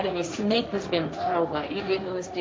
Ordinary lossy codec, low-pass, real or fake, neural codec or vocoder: none; none; fake; codec, 16 kHz, 1.1 kbps, Voila-Tokenizer